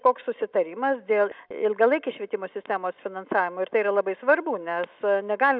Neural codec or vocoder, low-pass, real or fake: none; 5.4 kHz; real